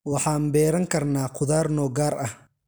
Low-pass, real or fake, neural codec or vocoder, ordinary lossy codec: none; real; none; none